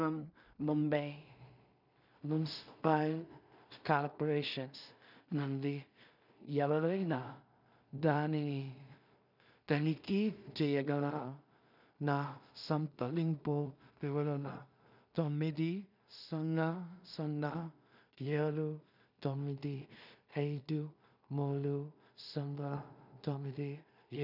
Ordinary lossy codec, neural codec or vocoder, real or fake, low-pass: none; codec, 16 kHz in and 24 kHz out, 0.4 kbps, LongCat-Audio-Codec, two codebook decoder; fake; 5.4 kHz